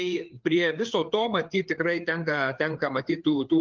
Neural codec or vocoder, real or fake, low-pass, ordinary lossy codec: codec, 16 kHz, 4 kbps, FreqCodec, larger model; fake; 7.2 kHz; Opus, 32 kbps